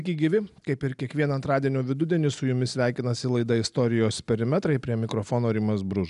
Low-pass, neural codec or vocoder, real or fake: 10.8 kHz; none; real